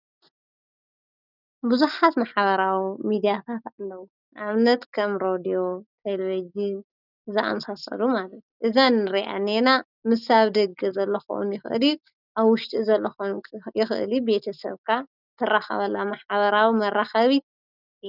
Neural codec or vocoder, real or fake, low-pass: none; real; 5.4 kHz